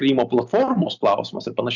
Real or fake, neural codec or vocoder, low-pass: real; none; 7.2 kHz